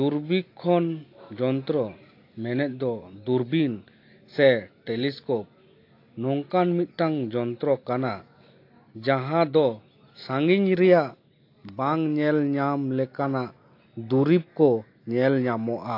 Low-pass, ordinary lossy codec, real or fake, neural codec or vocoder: 5.4 kHz; AAC, 32 kbps; real; none